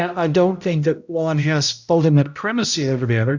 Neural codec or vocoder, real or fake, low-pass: codec, 16 kHz, 0.5 kbps, X-Codec, HuBERT features, trained on balanced general audio; fake; 7.2 kHz